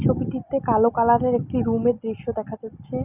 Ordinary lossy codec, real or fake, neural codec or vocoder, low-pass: none; real; none; 3.6 kHz